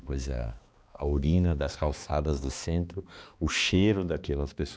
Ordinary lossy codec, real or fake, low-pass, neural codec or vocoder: none; fake; none; codec, 16 kHz, 2 kbps, X-Codec, HuBERT features, trained on balanced general audio